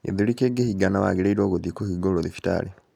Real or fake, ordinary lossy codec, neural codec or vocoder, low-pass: real; none; none; 19.8 kHz